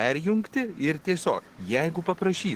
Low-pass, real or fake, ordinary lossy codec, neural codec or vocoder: 14.4 kHz; fake; Opus, 16 kbps; codec, 44.1 kHz, 7.8 kbps, DAC